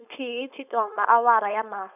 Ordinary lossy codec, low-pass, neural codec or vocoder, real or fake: none; 3.6 kHz; codec, 16 kHz, 4.8 kbps, FACodec; fake